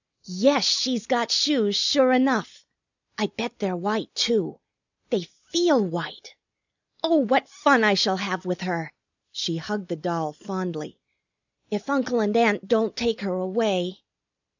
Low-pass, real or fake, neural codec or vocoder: 7.2 kHz; real; none